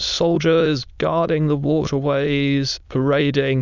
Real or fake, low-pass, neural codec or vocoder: fake; 7.2 kHz; autoencoder, 22.05 kHz, a latent of 192 numbers a frame, VITS, trained on many speakers